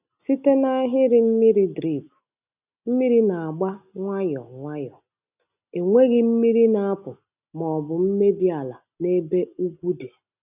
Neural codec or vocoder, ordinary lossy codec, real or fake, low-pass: none; none; real; 3.6 kHz